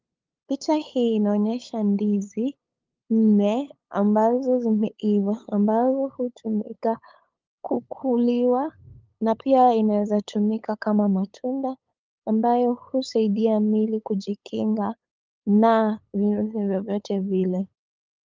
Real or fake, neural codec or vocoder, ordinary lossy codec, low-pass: fake; codec, 16 kHz, 8 kbps, FunCodec, trained on LibriTTS, 25 frames a second; Opus, 24 kbps; 7.2 kHz